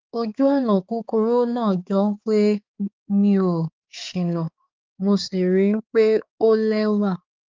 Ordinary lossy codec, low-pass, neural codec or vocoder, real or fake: Opus, 24 kbps; 7.2 kHz; codec, 16 kHz, 4 kbps, X-Codec, HuBERT features, trained on balanced general audio; fake